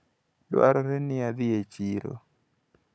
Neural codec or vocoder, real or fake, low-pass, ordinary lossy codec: codec, 16 kHz, 16 kbps, FunCodec, trained on Chinese and English, 50 frames a second; fake; none; none